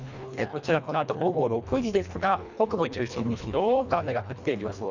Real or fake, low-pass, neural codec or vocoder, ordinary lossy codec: fake; 7.2 kHz; codec, 24 kHz, 1.5 kbps, HILCodec; none